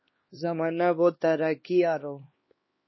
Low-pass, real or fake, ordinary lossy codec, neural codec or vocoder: 7.2 kHz; fake; MP3, 24 kbps; codec, 16 kHz, 2 kbps, X-Codec, HuBERT features, trained on LibriSpeech